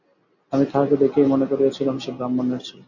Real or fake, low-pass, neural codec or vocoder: real; 7.2 kHz; none